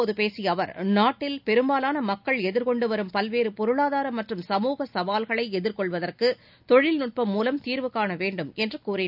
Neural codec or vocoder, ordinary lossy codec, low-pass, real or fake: none; none; 5.4 kHz; real